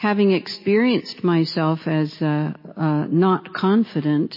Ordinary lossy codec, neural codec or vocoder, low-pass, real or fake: MP3, 24 kbps; none; 5.4 kHz; real